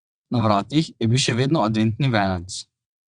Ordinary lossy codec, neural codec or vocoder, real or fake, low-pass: Opus, 64 kbps; vocoder, 22.05 kHz, 80 mel bands, WaveNeXt; fake; 9.9 kHz